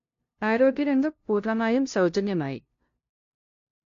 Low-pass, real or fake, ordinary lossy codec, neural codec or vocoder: 7.2 kHz; fake; AAC, 48 kbps; codec, 16 kHz, 0.5 kbps, FunCodec, trained on LibriTTS, 25 frames a second